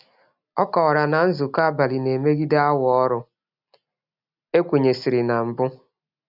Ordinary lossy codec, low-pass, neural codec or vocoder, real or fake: none; 5.4 kHz; none; real